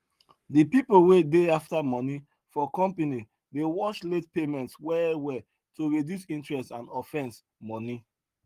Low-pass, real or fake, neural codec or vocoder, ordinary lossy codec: 14.4 kHz; fake; codec, 44.1 kHz, 7.8 kbps, Pupu-Codec; Opus, 32 kbps